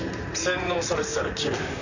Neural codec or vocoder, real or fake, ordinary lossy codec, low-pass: vocoder, 44.1 kHz, 128 mel bands, Pupu-Vocoder; fake; none; 7.2 kHz